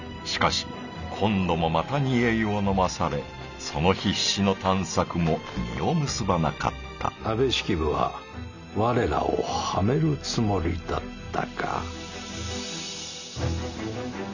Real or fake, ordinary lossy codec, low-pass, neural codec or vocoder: real; none; 7.2 kHz; none